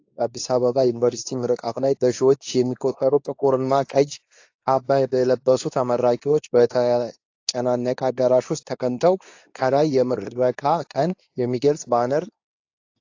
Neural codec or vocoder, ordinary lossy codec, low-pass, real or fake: codec, 24 kHz, 0.9 kbps, WavTokenizer, medium speech release version 2; AAC, 48 kbps; 7.2 kHz; fake